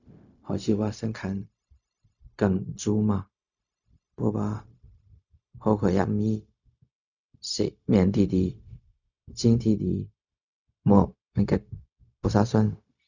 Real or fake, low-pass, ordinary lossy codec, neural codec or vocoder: fake; 7.2 kHz; none; codec, 16 kHz, 0.4 kbps, LongCat-Audio-Codec